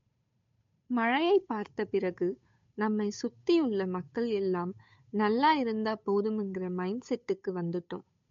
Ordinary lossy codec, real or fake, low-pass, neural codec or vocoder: MP3, 48 kbps; fake; 7.2 kHz; codec, 16 kHz, 8 kbps, FunCodec, trained on Chinese and English, 25 frames a second